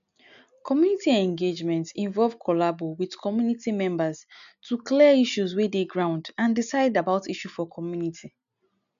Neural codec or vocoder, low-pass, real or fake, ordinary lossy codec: none; 7.2 kHz; real; none